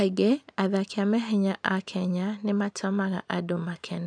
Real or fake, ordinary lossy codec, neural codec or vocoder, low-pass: real; none; none; 9.9 kHz